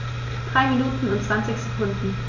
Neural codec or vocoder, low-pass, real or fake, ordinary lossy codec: none; 7.2 kHz; real; none